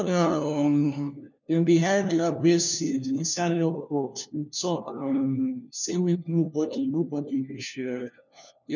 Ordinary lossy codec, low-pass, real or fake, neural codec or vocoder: none; 7.2 kHz; fake; codec, 16 kHz, 1 kbps, FunCodec, trained on LibriTTS, 50 frames a second